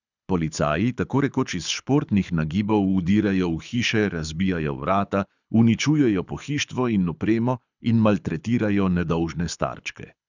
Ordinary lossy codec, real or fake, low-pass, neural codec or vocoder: none; fake; 7.2 kHz; codec, 24 kHz, 6 kbps, HILCodec